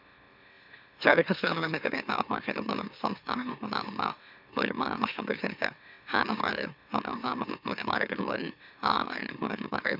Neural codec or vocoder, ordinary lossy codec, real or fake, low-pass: autoencoder, 44.1 kHz, a latent of 192 numbers a frame, MeloTTS; none; fake; 5.4 kHz